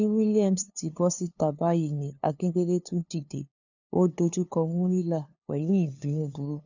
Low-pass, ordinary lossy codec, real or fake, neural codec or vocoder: 7.2 kHz; none; fake; codec, 16 kHz, 2 kbps, FunCodec, trained on LibriTTS, 25 frames a second